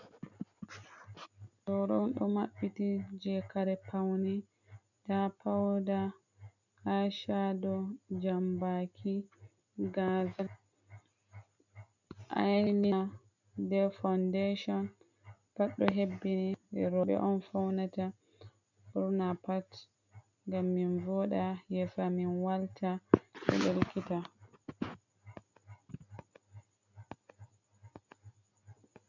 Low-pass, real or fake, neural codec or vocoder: 7.2 kHz; real; none